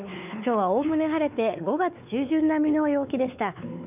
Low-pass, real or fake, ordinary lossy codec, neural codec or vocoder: 3.6 kHz; fake; none; codec, 16 kHz, 4 kbps, X-Codec, WavLM features, trained on Multilingual LibriSpeech